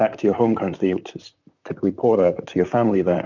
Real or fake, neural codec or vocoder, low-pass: fake; vocoder, 44.1 kHz, 128 mel bands, Pupu-Vocoder; 7.2 kHz